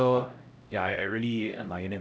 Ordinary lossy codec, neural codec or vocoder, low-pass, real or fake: none; codec, 16 kHz, 0.5 kbps, X-Codec, HuBERT features, trained on LibriSpeech; none; fake